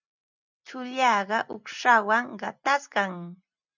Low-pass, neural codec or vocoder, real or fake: 7.2 kHz; none; real